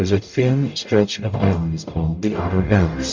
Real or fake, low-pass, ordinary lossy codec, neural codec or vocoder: fake; 7.2 kHz; MP3, 64 kbps; codec, 44.1 kHz, 0.9 kbps, DAC